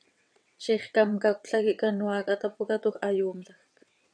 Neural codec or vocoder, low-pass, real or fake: vocoder, 22.05 kHz, 80 mel bands, WaveNeXt; 9.9 kHz; fake